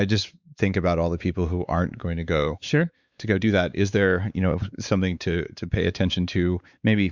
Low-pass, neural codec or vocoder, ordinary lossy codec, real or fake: 7.2 kHz; codec, 16 kHz, 4 kbps, X-Codec, WavLM features, trained on Multilingual LibriSpeech; Opus, 64 kbps; fake